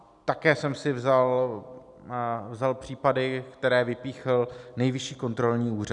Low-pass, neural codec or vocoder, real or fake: 10.8 kHz; none; real